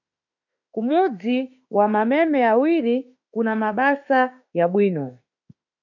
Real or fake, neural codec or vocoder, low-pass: fake; autoencoder, 48 kHz, 32 numbers a frame, DAC-VAE, trained on Japanese speech; 7.2 kHz